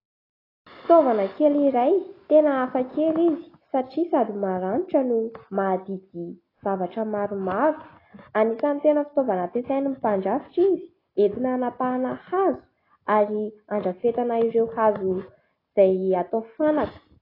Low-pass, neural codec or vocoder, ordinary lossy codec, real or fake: 5.4 kHz; none; AAC, 24 kbps; real